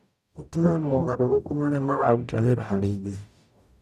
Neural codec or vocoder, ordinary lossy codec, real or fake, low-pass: codec, 44.1 kHz, 0.9 kbps, DAC; none; fake; 14.4 kHz